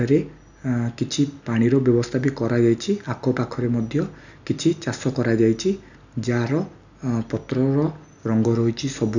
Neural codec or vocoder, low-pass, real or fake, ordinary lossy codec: none; 7.2 kHz; real; none